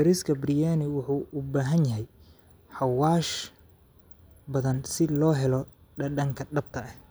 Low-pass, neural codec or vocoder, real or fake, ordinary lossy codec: none; none; real; none